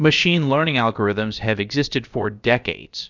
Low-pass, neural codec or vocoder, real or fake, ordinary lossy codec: 7.2 kHz; codec, 16 kHz, about 1 kbps, DyCAST, with the encoder's durations; fake; Opus, 64 kbps